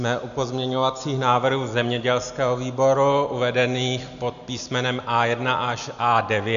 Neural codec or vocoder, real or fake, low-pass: none; real; 7.2 kHz